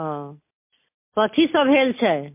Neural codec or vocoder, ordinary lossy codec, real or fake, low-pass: none; MP3, 24 kbps; real; 3.6 kHz